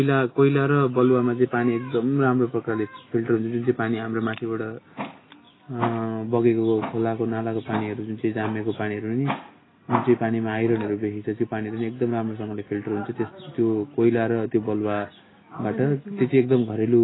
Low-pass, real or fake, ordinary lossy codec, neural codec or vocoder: 7.2 kHz; real; AAC, 16 kbps; none